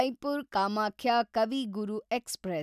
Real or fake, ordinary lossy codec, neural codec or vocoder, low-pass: real; none; none; 14.4 kHz